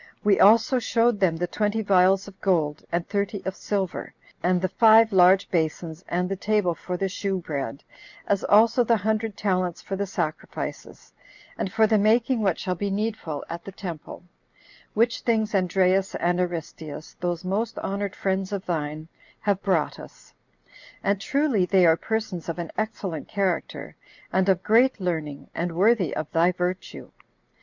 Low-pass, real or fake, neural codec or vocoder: 7.2 kHz; real; none